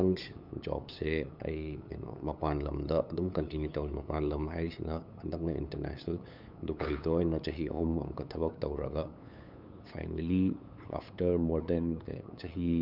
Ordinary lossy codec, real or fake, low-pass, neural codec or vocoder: none; fake; 5.4 kHz; codec, 16 kHz, 8 kbps, FunCodec, trained on LibriTTS, 25 frames a second